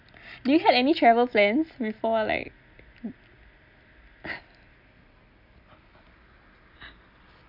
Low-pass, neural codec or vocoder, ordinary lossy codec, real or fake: 5.4 kHz; none; none; real